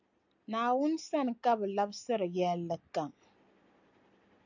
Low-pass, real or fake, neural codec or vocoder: 7.2 kHz; real; none